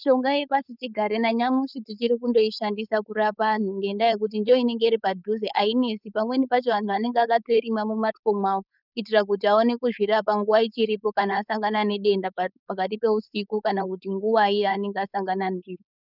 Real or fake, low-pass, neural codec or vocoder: fake; 5.4 kHz; codec, 16 kHz, 4.8 kbps, FACodec